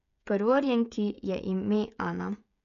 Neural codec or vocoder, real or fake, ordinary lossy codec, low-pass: codec, 16 kHz, 16 kbps, FreqCodec, smaller model; fake; none; 7.2 kHz